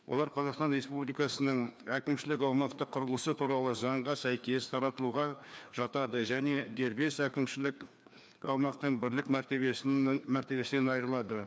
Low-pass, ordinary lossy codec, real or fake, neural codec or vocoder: none; none; fake; codec, 16 kHz, 2 kbps, FreqCodec, larger model